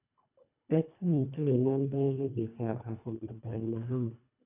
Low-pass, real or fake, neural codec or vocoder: 3.6 kHz; fake; codec, 24 kHz, 1.5 kbps, HILCodec